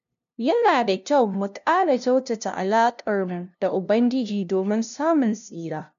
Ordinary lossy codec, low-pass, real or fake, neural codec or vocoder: none; 7.2 kHz; fake; codec, 16 kHz, 0.5 kbps, FunCodec, trained on LibriTTS, 25 frames a second